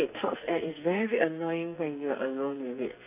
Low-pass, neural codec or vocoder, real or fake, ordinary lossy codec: 3.6 kHz; codec, 44.1 kHz, 2.6 kbps, SNAC; fake; none